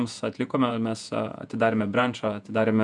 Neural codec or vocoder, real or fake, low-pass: vocoder, 48 kHz, 128 mel bands, Vocos; fake; 10.8 kHz